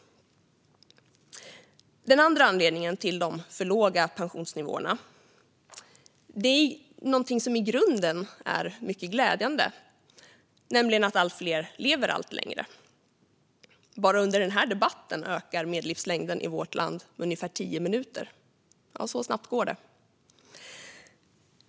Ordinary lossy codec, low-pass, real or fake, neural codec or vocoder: none; none; real; none